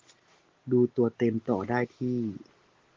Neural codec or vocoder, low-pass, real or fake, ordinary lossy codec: none; 7.2 kHz; real; Opus, 16 kbps